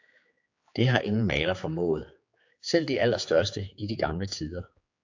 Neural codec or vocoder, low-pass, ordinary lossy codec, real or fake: codec, 16 kHz, 4 kbps, X-Codec, HuBERT features, trained on general audio; 7.2 kHz; AAC, 48 kbps; fake